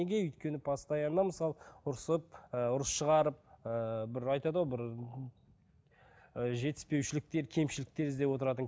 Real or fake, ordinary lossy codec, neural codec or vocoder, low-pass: real; none; none; none